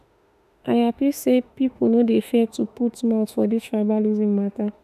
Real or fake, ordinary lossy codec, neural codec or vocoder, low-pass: fake; none; autoencoder, 48 kHz, 32 numbers a frame, DAC-VAE, trained on Japanese speech; 14.4 kHz